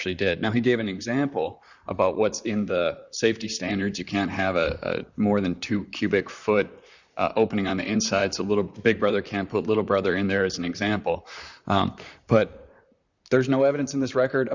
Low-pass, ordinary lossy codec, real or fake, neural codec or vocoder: 7.2 kHz; Opus, 64 kbps; fake; vocoder, 44.1 kHz, 128 mel bands, Pupu-Vocoder